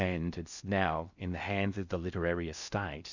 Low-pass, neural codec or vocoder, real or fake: 7.2 kHz; codec, 16 kHz in and 24 kHz out, 0.6 kbps, FocalCodec, streaming, 4096 codes; fake